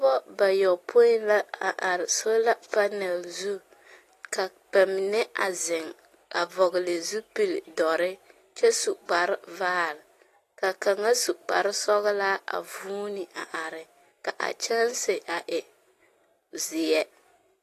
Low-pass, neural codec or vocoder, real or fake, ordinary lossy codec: 14.4 kHz; none; real; AAC, 48 kbps